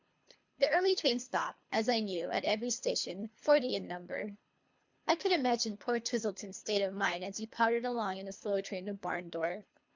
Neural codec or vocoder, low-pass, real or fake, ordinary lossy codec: codec, 24 kHz, 3 kbps, HILCodec; 7.2 kHz; fake; MP3, 64 kbps